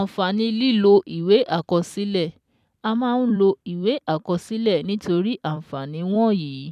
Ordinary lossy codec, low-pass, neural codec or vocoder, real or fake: none; 14.4 kHz; vocoder, 44.1 kHz, 128 mel bands every 256 samples, BigVGAN v2; fake